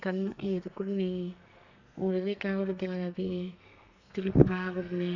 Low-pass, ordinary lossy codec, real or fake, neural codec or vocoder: 7.2 kHz; none; fake; codec, 32 kHz, 1.9 kbps, SNAC